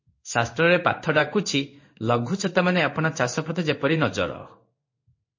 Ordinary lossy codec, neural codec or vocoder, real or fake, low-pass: MP3, 32 kbps; codec, 16 kHz in and 24 kHz out, 1 kbps, XY-Tokenizer; fake; 7.2 kHz